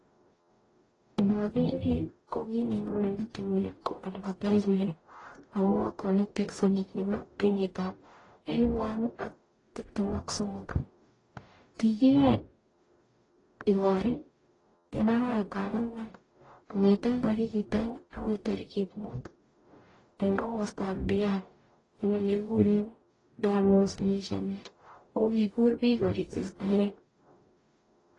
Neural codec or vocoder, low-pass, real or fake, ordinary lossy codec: codec, 44.1 kHz, 0.9 kbps, DAC; 10.8 kHz; fake; AAC, 32 kbps